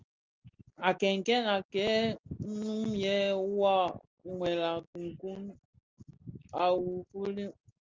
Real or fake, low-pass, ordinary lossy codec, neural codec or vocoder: real; 7.2 kHz; Opus, 32 kbps; none